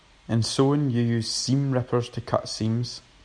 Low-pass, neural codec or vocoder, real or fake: 9.9 kHz; none; real